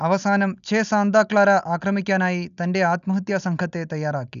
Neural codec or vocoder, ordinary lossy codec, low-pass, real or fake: none; none; 7.2 kHz; real